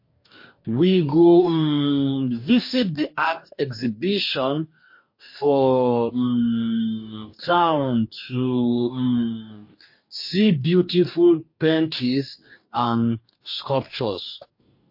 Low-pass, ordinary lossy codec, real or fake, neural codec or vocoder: 5.4 kHz; MP3, 32 kbps; fake; codec, 44.1 kHz, 2.6 kbps, DAC